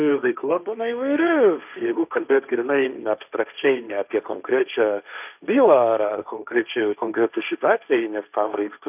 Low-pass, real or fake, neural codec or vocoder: 3.6 kHz; fake; codec, 16 kHz, 1.1 kbps, Voila-Tokenizer